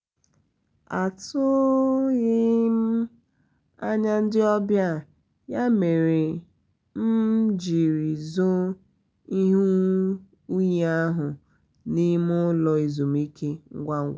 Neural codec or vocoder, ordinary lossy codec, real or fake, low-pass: none; none; real; none